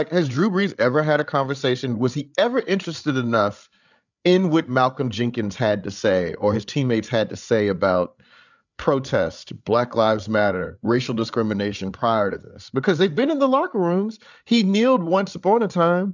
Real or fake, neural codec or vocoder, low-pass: fake; codec, 16 kHz, 8 kbps, FreqCodec, larger model; 7.2 kHz